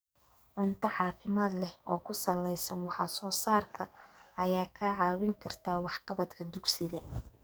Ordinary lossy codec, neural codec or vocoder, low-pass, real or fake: none; codec, 44.1 kHz, 2.6 kbps, SNAC; none; fake